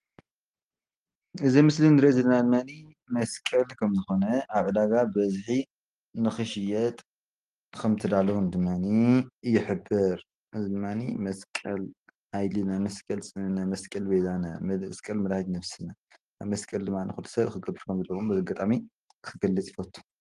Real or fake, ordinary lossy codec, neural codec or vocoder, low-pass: real; Opus, 24 kbps; none; 10.8 kHz